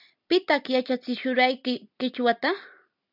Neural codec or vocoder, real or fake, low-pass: none; real; 5.4 kHz